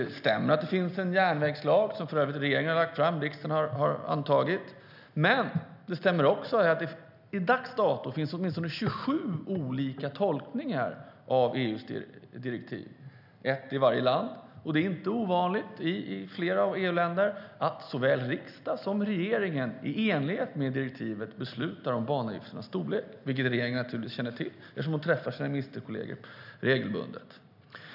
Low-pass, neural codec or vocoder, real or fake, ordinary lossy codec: 5.4 kHz; none; real; none